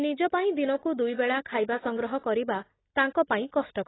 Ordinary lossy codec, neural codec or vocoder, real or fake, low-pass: AAC, 16 kbps; none; real; 7.2 kHz